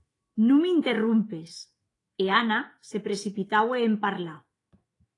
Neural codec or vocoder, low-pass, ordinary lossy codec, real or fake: autoencoder, 48 kHz, 128 numbers a frame, DAC-VAE, trained on Japanese speech; 10.8 kHz; AAC, 32 kbps; fake